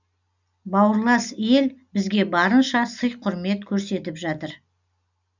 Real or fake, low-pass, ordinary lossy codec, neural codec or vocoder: real; 7.2 kHz; none; none